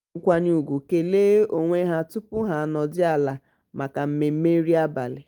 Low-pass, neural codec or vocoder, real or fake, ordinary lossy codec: 19.8 kHz; none; real; Opus, 32 kbps